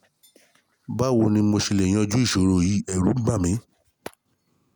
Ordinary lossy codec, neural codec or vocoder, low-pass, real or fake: none; none; none; real